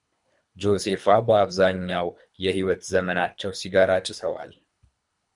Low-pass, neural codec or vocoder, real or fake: 10.8 kHz; codec, 24 kHz, 3 kbps, HILCodec; fake